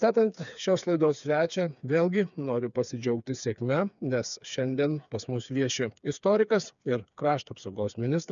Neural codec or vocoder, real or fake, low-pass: codec, 16 kHz, 4 kbps, FreqCodec, smaller model; fake; 7.2 kHz